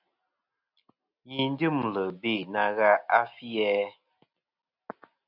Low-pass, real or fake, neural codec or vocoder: 5.4 kHz; real; none